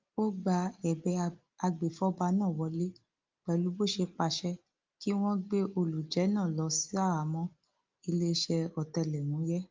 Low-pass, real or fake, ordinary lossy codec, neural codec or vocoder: 7.2 kHz; real; Opus, 32 kbps; none